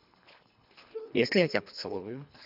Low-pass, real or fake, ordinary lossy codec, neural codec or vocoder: 5.4 kHz; fake; none; codec, 24 kHz, 3 kbps, HILCodec